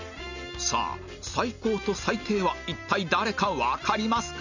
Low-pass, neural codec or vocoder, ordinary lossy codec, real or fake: 7.2 kHz; none; none; real